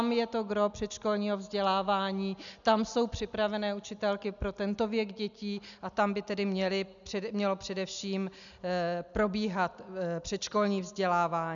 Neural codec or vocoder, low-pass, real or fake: none; 7.2 kHz; real